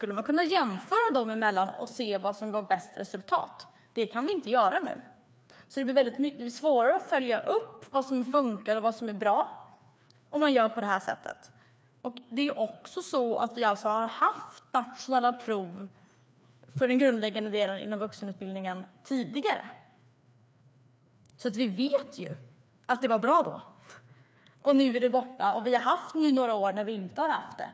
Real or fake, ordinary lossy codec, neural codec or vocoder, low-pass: fake; none; codec, 16 kHz, 2 kbps, FreqCodec, larger model; none